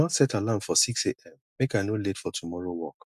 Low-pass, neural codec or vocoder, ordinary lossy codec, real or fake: 14.4 kHz; none; MP3, 96 kbps; real